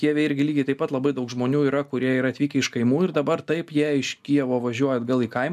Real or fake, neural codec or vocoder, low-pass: real; none; 14.4 kHz